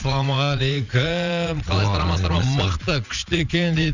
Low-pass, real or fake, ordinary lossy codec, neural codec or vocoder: 7.2 kHz; fake; none; vocoder, 22.05 kHz, 80 mel bands, Vocos